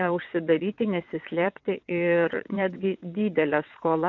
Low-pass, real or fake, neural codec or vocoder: 7.2 kHz; fake; vocoder, 22.05 kHz, 80 mel bands, WaveNeXt